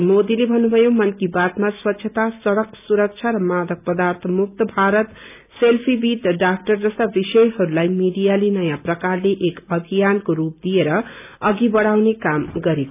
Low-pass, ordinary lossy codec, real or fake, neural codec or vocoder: 3.6 kHz; none; real; none